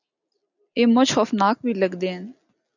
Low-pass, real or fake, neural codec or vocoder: 7.2 kHz; real; none